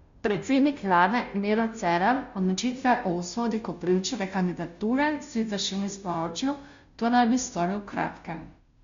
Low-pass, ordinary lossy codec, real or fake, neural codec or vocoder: 7.2 kHz; MP3, 64 kbps; fake; codec, 16 kHz, 0.5 kbps, FunCodec, trained on Chinese and English, 25 frames a second